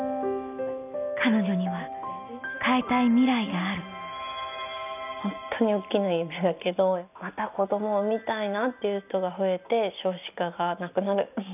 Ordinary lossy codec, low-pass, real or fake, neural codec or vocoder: none; 3.6 kHz; real; none